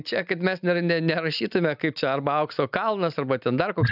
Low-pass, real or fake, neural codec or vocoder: 5.4 kHz; real; none